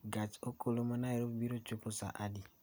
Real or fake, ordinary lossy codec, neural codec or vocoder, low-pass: real; none; none; none